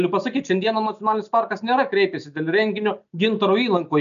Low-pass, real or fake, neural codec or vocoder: 7.2 kHz; real; none